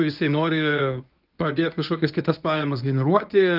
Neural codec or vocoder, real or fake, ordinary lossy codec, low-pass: codec, 24 kHz, 0.9 kbps, WavTokenizer, medium speech release version 1; fake; Opus, 24 kbps; 5.4 kHz